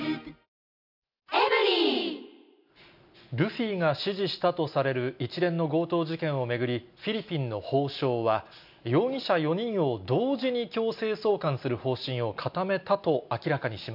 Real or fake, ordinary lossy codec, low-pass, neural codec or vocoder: real; none; 5.4 kHz; none